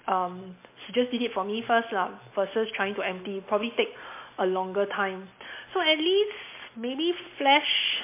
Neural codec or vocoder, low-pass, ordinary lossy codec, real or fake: none; 3.6 kHz; MP3, 24 kbps; real